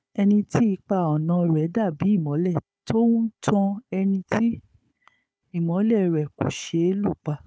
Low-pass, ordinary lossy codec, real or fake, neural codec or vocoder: none; none; fake; codec, 16 kHz, 4 kbps, FunCodec, trained on Chinese and English, 50 frames a second